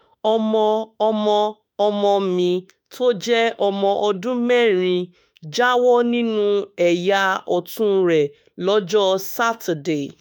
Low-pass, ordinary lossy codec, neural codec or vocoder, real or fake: none; none; autoencoder, 48 kHz, 32 numbers a frame, DAC-VAE, trained on Japanese speech; fake